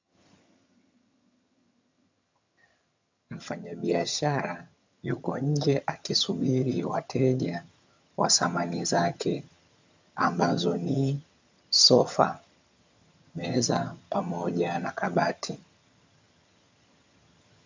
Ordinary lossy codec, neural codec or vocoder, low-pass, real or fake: MP3, 64 kbps; vocoder, 22.05 kHz, 80 mel bands, HiFi-GAN; 7.2 kHz; fake